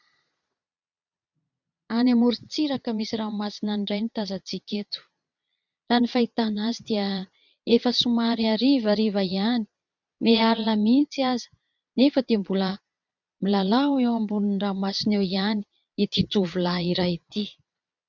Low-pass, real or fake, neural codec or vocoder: 7.2 kHz; fake; vocoder, 22.05 kHz, 80 mel bands, Vocos